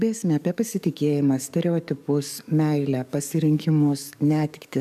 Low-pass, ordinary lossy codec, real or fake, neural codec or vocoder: 14.4 kHz; MP3, 96 kbps; fake; codec, 44.1 kHz, 7.8 kbps, DAC